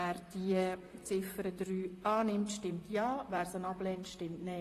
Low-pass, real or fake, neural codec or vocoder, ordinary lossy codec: 14.4 kHz; fake; vocoder, 44.1 kHz, 128 mel bands, Pupu-Vocoder; AAC, 48 kbps